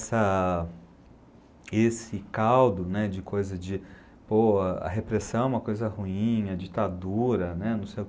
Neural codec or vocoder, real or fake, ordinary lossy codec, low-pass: none; real; none; none